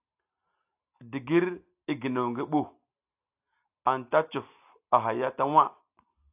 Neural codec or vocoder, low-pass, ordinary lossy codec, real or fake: none; 3.6 kHz; AAC, 32 kbps; real